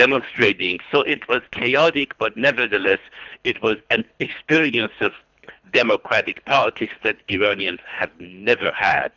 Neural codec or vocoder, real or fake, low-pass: codec, 24 kHz, 3 kbps, HILCodec; fake; 7.2 kHz